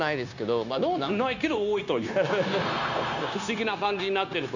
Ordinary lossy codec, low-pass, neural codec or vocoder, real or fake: none; 7.2 kHz; codec, 16 kHz, 0.9 kbps, LongCat-Audio-Codec; fake